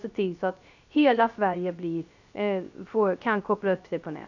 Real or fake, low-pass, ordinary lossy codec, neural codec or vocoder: fake; 7.2 kHz; none; codec, 16 kHz, 0.3 kbps, FocalCodec